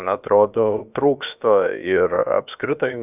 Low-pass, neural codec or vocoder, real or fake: 3.6 kHz; codec, 16 kHz, about 1 kbps, DyCAST, with the encoder's durations; fake